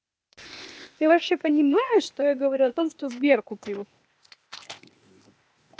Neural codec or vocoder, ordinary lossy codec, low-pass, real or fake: codec, 16 kHz, 0.8 kbps, ZipCodec; none; none; fake